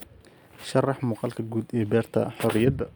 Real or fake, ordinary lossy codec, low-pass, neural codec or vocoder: real; none; none; none